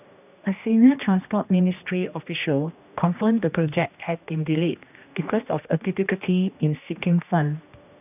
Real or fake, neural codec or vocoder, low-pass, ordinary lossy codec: fake; codec, 16 kHz, 1 kbps, X-Codec, HuBERT features, trained on general audio; 3.6 kHz; none